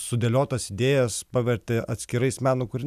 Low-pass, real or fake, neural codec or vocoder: 14.4 kHz; real; none